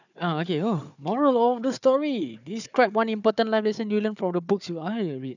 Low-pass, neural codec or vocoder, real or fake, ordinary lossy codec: 7.2 kHz; codec, 16 kHz, 4 kbps, FunCodec, trained on Chinese and English, 50 frames a second; fake; none